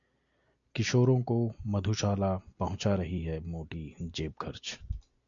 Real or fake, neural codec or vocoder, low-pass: real; none; 7.2 kHz